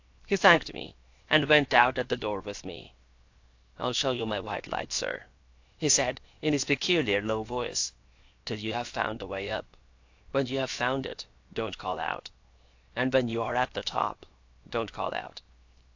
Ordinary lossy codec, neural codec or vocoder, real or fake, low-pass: AAC, 48 kbps; codec, 16 kHz, 0.7 kbps, FocalCodec; fake; 7.2 kHz